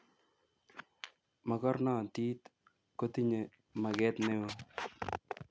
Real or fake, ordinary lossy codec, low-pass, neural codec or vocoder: real; none; none; none